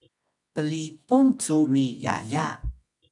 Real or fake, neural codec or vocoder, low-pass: fake; codec, 24 kHz, 0.9 kbps, WavTokenizer, medium music audio release; 10.8 kHz